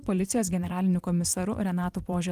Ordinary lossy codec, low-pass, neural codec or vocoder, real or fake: Opus, 24 kbps; 14.4 kHz; vocoder, 44.1 kHz, 128 mel bands every 256 samples, BigVGAN v2; fake